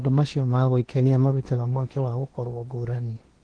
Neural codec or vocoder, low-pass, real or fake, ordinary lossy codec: codec, 16 kHz in and 24 kHz out, 0.8 kbps, FocalCodec, streaming, 65536 codes; 9.9 kHz; fake; Opus, 16 kbps